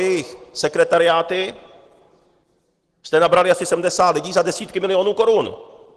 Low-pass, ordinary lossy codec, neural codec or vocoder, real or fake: 10.8 kHz; Opus, 16 kbps; none; real